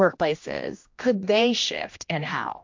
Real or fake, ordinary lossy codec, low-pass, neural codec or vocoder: fake; MP3, 48 kbps; 7.2 kHz; codec, 16 kHz, 1 kbps, X-Codec, HuBERT features, trained on general audio